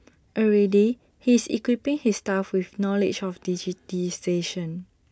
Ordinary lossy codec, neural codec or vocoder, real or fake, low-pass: none; none; real; none